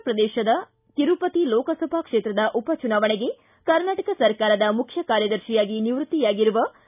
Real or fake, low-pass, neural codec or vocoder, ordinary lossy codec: real; 3.6 kHz; none; none